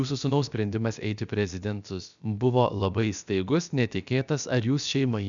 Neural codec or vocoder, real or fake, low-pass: codec, 16 kHz, about 1 kbps, DyCAST, with the encoder's durations; fake; 7.2 kHz